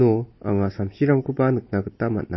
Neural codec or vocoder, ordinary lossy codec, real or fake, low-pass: none; MP3, 24 kbps; real; 7.2 kHz